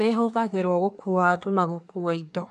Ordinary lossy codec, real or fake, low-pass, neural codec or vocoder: none; fake; 10.8 kHz; codec, 24 kHz, 1 kbps, SNAC